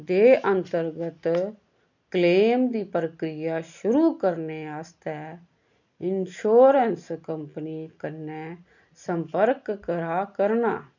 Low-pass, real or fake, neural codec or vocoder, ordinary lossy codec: 7.2 kHz; real; none; none